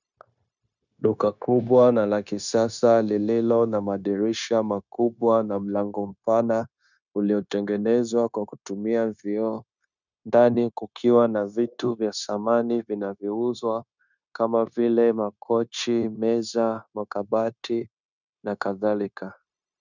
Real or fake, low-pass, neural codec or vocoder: fake; 7.2 kHz; codec, 16 kHz, 0.9 kbps, LongCat-Audio-Codec